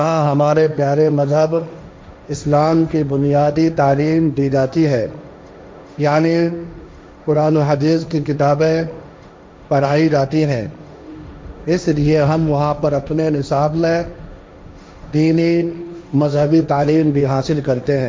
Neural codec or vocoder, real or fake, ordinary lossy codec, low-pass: codec, 16 kHz, 1.1 kbps, Voila-Tokenizer; fake; none; none